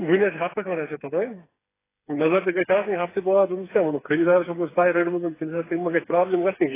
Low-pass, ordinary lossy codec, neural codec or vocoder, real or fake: 3.6 kHz; AAC, 16 kbps; codec, 16 kHz, 4 kbps, FreqCodec, smaller model; fake